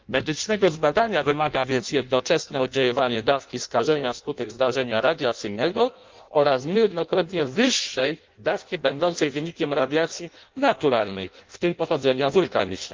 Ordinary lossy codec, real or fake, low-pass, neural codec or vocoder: Opus, 24 kbps; fake; 7.2 kHz; codec, 16 kHz in and 24 kHz out, 0.6 kbps, FireRedTTS-2 codec